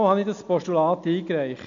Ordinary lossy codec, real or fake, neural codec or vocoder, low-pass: MP3, 64 kbps; real; none; 7.2 kHz